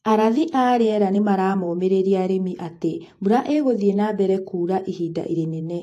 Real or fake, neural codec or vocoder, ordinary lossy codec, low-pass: fake; vocoder, 48 kHz, 128 mel bands, Vocos; AAC, 64 kbps; 14.4 kHz